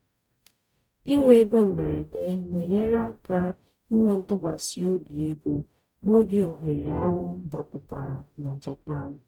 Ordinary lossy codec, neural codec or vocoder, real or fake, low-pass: MP3, 96 kbps; codec, 44.1 kHz, 0.9 kbps, DAC; fake; 19.8 kHz